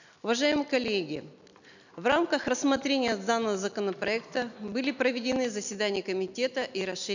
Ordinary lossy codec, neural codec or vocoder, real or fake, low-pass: none; none; real; 7.2 kHz